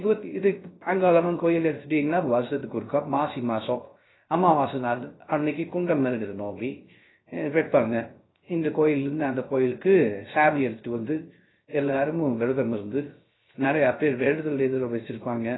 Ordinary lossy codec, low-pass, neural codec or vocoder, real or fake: AAC, 16 kbps; 7.2 kHz; codec, 16 kHz, 0.3 kbps, FocalCodec; fake